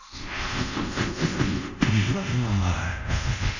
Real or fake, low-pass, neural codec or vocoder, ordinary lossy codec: fake; 7.2 kHz; codec, 24 kHz, 0.5 kbps, DualCodec; none